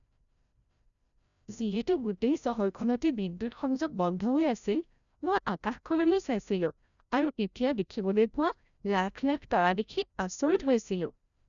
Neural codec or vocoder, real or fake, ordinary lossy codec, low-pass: codec, 16 kHz, 0.5 kbps, FreqCodec, larger model; fake; none; 7.2 kHz